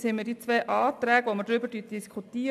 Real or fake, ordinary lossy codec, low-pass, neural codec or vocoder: real; AAC, 96 kbps; 14.4 kHz; none